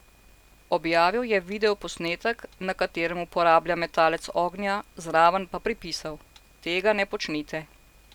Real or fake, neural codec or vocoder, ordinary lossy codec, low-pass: real; none; none; 19.8 kHz